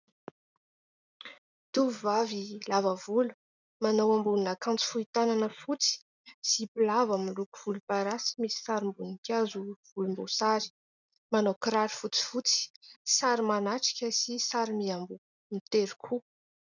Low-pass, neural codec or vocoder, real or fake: 7.2 kHz; none; real